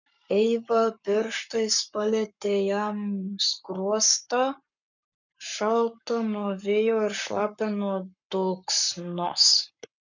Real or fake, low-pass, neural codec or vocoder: fake; 7.2 kHz; codec, 44.1 kHz, 7.8 kbps, Pupu-Codec